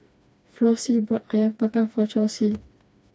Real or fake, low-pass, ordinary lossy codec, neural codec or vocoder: fake; none; none; codec, 16 kHz, 2 kbps, FreqCodec, smaller model